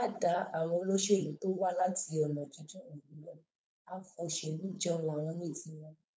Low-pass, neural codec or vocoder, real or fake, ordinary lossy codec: none; codec, 16 kHz, 4.8 kbps, FACodec; fake; none